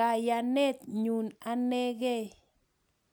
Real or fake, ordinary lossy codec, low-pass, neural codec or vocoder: real; none; none; none